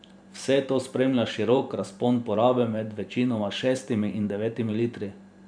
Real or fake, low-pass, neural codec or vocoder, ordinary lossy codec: fake; 9.9 kHz; vocoder, 44.1 kHz, 128 mel bands every 512 samples, BigVGAN v2; none